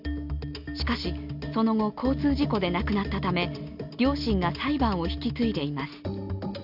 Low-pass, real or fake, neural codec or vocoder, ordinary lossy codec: 5.4 kHz; real; none; none